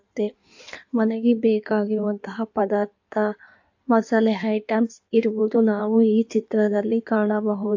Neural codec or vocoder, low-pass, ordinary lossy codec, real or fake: codec, 16 kHz in and 24 kHz out, 1.1 kbps, FireRedTTS-2 codec; 7.2 kHz; AAC, 48 kbps; fake